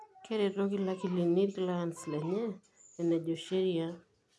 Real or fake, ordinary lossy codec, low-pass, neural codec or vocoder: real; none; none; none